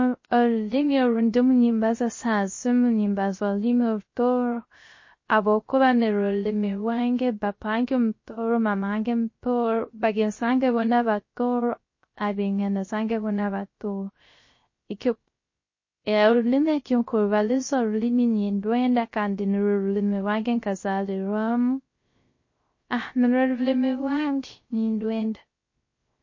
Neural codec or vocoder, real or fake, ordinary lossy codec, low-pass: codec, 16 kHz, 0.3 kbps, FocalCodec; fake; MP3, 32 kbps; 7.2 kHz